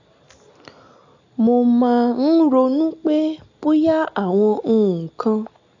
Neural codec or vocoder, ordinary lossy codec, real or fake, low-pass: none; AAC, 48 kbps; real; 7.2 kHz